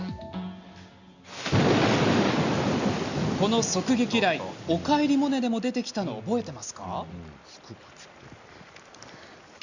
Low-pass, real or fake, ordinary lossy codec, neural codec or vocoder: 7.2 kHz; real; Opus, 64 kbps; none